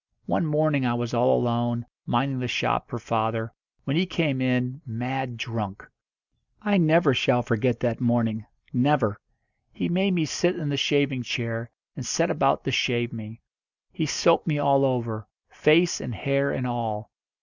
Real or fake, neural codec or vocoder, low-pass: real; none; 7.2 kHz